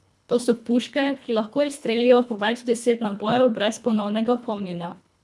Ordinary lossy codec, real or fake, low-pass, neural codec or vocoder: none; fake; none; codec, 24 kHz, 1.5 kbps, HILCodec